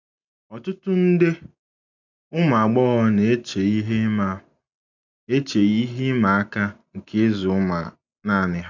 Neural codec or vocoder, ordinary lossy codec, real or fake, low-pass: none; none; real; 7.2 kHz